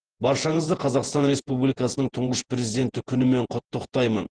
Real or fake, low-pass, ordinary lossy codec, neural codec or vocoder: fake; 9.9 kHz; Opus, 16 kbps; vocoder, 48 kHz, 128 mel bands, Vocos